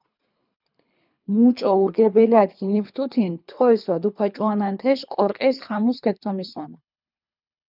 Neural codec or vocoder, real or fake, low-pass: codec, 24 kHz, 3 kbps, HILCodec; fake; 5.4 kHz